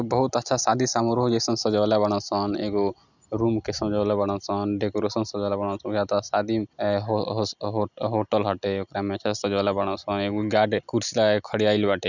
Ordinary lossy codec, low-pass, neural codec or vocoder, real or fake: none; 7.2 kHz; none; real